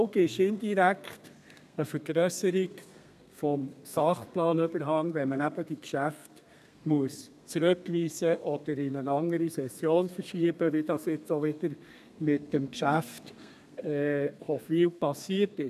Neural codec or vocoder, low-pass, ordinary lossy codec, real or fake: codec, 32 kHz, 1.9 kbps, SNAC; 14.4 kHz; none; fake